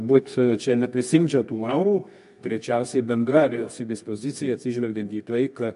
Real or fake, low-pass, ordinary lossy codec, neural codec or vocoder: fake; 10.8 kHz; MP3, 64 kbps; codec, 24 kHz, 0.9 kbps, WavTokenizer, medium music audio release